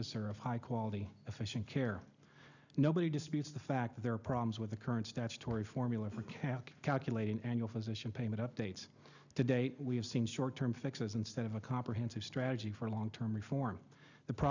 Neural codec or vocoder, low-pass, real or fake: none; 7.2 kHz; real